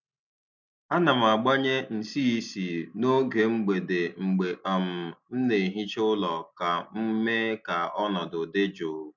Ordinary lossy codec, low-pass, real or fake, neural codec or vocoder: MP3, 64 kbps; 7.2 kHz; real; none